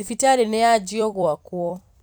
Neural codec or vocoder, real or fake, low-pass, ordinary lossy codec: vocoder, 44.1 kHz, 128 mel bands every 256 samples, BigVGAN v2; fake; none; none